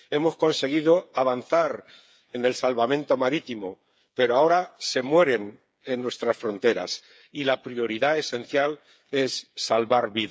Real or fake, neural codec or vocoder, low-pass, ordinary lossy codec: fake; codec, 16 kHz, 8 kbps, FreqCodec, smaller model; none; none